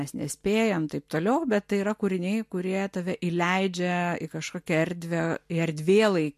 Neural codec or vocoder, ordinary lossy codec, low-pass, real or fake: none; MP3, 64 kbps; 14.4 kHz; real